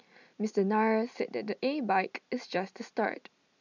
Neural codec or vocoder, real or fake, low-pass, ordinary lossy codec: none; real; 7.2 kHz; none